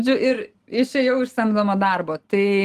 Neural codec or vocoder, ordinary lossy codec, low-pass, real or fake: none; Opus, 24 kbps; 14.4 kHz; real